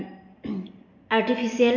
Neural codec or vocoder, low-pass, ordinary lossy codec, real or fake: none; 7.2 kHz; none; real